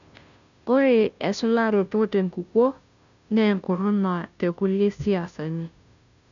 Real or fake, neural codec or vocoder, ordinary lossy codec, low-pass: fake; codec, 16 kHz, 0.5 kbps, FunCodec, trained on Chinese and English, 25 frames a second; none; 7.2 kHz